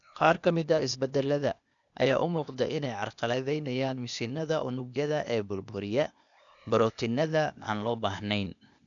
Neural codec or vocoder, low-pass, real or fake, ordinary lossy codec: codec, 16 kHz, 0.8 kbps, ZipCodec; 7.2 kHz; fake; none